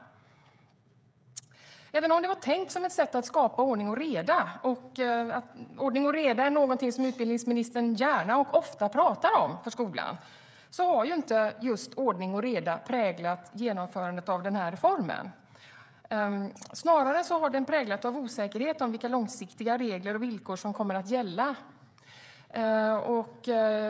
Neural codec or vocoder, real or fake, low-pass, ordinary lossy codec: codec, 16 kHz, 16 kbps, FreqCodec, smaller model; fake; none; none